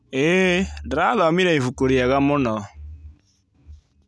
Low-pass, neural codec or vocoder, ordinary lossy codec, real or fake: none; none; none; real